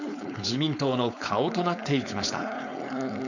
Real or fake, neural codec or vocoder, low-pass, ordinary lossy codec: fake; codec, 16 kHz, 4.8 kbps, FACodec; 7.2 kHz; none